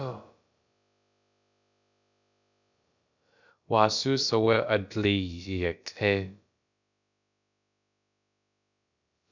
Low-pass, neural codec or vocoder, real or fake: 7.2 kHz; codec, 16 kHz, about 1 kbps, DyCAST, with the encoder's durations; fake